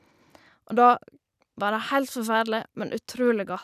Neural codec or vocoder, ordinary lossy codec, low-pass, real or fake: none; none; 14.4 kHz; real